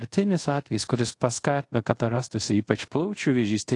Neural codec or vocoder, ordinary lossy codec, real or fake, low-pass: codec, 24 kHz, 0.5 kbps, DualCodec; AAC, 48 kbps; fake; 10.8 kHz